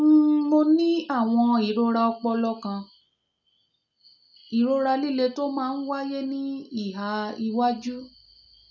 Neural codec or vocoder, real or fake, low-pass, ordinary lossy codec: none; real; 7.2 kHz; none